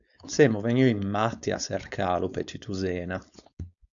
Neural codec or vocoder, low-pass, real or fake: codec, 16 kHz, 4.8 kbps, FACodec; 7.2 kHz; fake